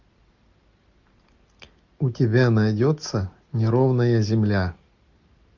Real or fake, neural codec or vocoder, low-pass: real; none; 7.2 kHz